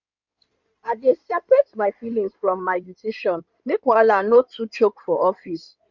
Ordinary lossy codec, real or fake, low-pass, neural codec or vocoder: none; fake; 7.2 kHz; codec, 16 kHz in and 24 kHz out, 2.2 kbps, FireRedTTS-2 codec